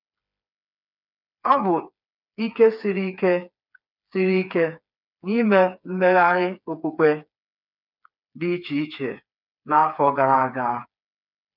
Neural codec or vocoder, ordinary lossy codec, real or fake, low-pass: codec, 16 kHz, 4 kbps, FreqCodec, smaller model; none; fake; 5.4 kHz